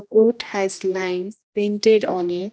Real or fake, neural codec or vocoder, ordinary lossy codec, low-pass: fake; codec, 16 kHz, 1 kbps, X-Codec, HuBERT features, trained on general audio; none; none